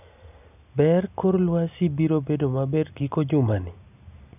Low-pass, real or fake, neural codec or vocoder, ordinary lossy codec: 3.6 kHz; real; none; none